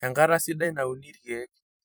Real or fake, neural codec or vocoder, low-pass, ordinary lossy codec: fake; vocoder, 44.1 kHz, 128 mel bands every 256 samples, BigVGAN v2; none; none